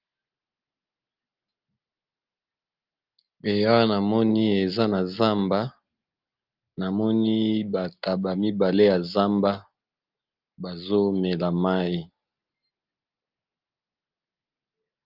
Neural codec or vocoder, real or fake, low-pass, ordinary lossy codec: none; real; 5.4 kHz; Opus, 24 kbps